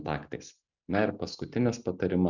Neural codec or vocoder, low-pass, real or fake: none; 7.2 kHz; real